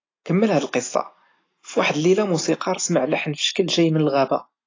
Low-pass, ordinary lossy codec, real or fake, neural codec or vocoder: 7.2 kHz; AAC, 32 kbps; real; none